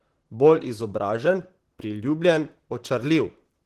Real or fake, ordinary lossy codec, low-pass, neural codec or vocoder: fake; Opus, 16 kbps; 14.4 kHz; vocoder, 44.1 kHz, 128 mel bands, Pupu-Vocoder